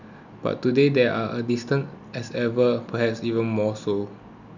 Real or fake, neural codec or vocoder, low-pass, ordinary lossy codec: real; none; 7.2 kHz; none